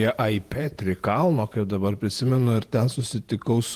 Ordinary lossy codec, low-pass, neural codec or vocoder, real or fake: Opus, 24 kbps; 14.4 kHz; vocoder, 48 kHz, 128 mel bands, Vocos; fake